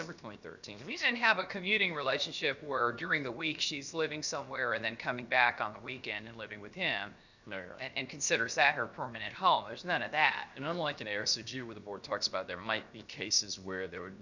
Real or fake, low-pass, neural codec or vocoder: fake; 7.2 kHz; codec, 16 kHz, 0.7 kbps, FocalCodec